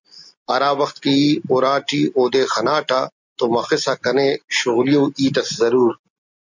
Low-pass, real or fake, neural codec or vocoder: 7.2 kHz; real; none